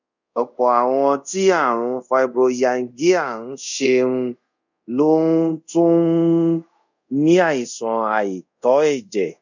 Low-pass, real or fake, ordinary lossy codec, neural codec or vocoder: 7.2 kHz; fake; none; codec, 24 kHz, 0.5 kbps, DualCodec